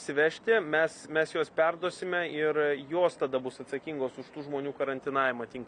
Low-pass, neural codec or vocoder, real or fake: 9.9 kHz; none; real